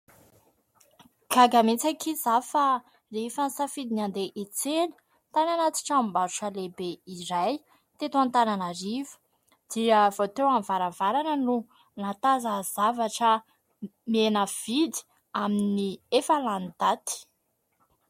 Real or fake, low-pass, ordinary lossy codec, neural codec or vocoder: real; 19.8 kHz; MP3, 64 kbps; none